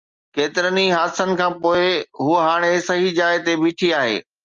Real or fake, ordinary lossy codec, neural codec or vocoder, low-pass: real; Opus, 32 kbps; none; 7.2 kHz